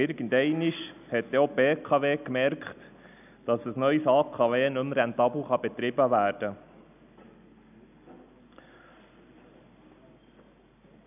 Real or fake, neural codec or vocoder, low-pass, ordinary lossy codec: real; none; 3.6 kHz; none